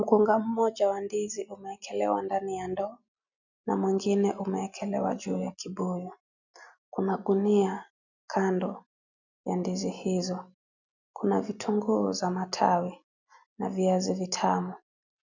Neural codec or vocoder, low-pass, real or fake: none; 7.2 kHz; real